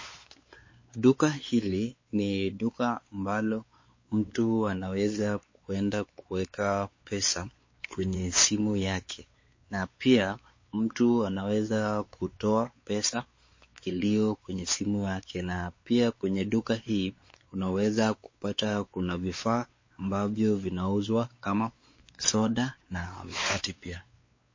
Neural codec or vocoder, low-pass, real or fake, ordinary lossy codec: codec, 16 kHz, 4 kbps, X-Codec, WavLM features, trained on Multilingual LibriSpeech; 7.2 kHz; fake; MP3, 32 kbps